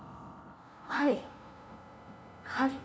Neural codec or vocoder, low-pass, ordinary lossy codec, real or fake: codec, 16 kHz, 0.5 kbps, FunCodec, trained on LibriTTS, 25 frames a second; none; none; fake